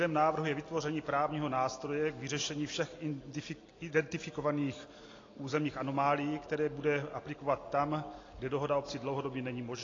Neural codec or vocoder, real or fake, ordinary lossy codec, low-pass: none; real; AAC, 32 kbps; 7.2 kHz